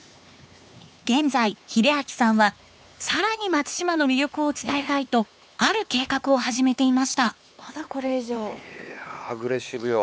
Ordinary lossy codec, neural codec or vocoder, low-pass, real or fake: none; codec, 16 kHz, 4 kbps, X-Codec, HuBERT features, trained on LibriSpeech; none; fake